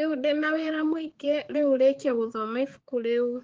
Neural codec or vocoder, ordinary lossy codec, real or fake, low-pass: codec, 16 kHz, 4 kbps, X-Codec, HuBERT features, trained on balanced general audio; Opus, 16 kbps; fake; 7.2 kHz